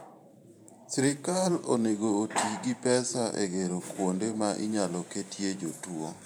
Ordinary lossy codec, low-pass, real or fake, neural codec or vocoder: none; none; fake; vocoder, 44.1 kHz, 128 mel bands every 512 samples, BigVGAN v2